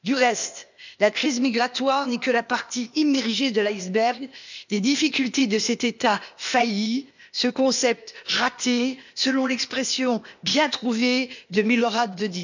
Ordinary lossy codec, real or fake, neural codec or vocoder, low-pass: none; fake; codec, 16 kHz, 0.8 kbps, ZipCodec; 7.2 kHz